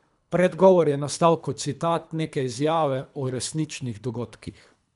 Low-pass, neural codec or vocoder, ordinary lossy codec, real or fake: 10.8 kHz; codec, 24 kHz, 3 kbps, HILCodec; none; fake